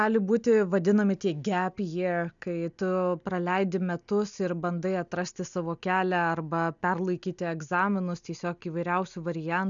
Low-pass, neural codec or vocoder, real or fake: 7.2 kHz; none; real